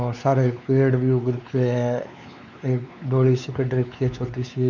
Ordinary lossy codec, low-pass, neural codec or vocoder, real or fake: none; 7.2 kHz; codec, 16 kHz, 4 kbps, X-Codec, WavLM features, trained on Multilingual LibriSpeech; fake